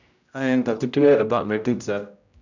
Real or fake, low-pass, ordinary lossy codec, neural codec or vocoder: fake; 7.2 kHz; none; codec, 16 kHz, 0.5 kbps, X-Codec, HuBERT features, trained on general audio